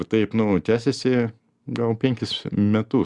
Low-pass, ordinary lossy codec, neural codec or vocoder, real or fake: 10.8 kHz; Opus, 64 kbps; none; real